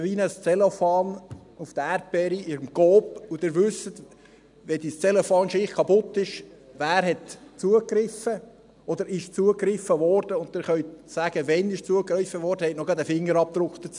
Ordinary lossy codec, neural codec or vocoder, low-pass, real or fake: none; none; 10.8 kHz; real